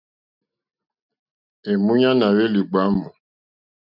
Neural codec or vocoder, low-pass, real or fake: none; 5.4 kHz; real